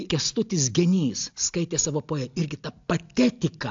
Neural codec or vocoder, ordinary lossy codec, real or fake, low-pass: none; AAC, 64 kbps; real; 7.2 kHz